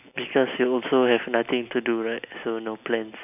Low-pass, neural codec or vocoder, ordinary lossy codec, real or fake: 3.6 kHz; none; none; real